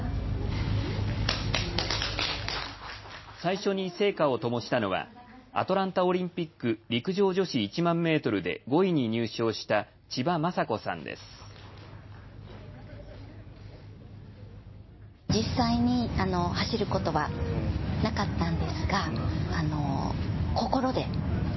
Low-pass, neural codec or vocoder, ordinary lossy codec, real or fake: 7.2 kHz; none; MP3, 24 kbps; real